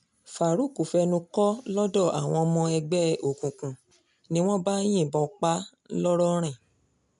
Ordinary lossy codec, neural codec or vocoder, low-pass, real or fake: none; none; 10.8 kHz; real